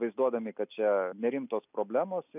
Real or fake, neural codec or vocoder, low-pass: real; none; 3.6 kHz